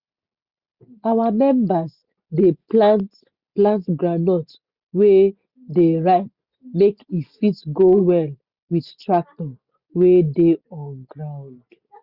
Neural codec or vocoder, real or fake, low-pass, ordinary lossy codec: none; real; 5.4 kHz; Opus, 64 kbps